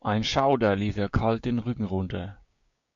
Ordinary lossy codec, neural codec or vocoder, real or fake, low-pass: MP3, 48 kbps; codec, 16 kHz, 6 kbps, DAC; fake; 7.2 kHz